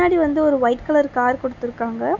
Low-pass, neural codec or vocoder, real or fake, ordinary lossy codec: 7.2 kHz; none; real; none